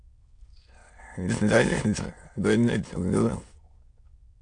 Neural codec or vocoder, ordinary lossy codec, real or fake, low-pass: autoencoder, 22.05 kHz, a latent of 192 numbers a frame, VITS, trained on many speakers; AAC, 64 kbps; fake; 9.9 kHz